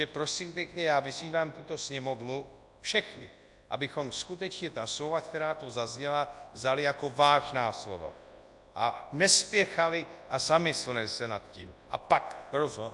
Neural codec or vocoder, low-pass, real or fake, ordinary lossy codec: codec, 24 kHz, 0.9 kbps, WavTokenizer, large speech release; 10.8 kHz; fake; AAC, 64 kbps